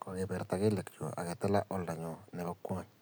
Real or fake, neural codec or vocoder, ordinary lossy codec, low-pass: real; none; none; none